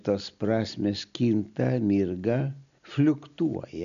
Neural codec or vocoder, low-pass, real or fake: none; 7.2 kHz; real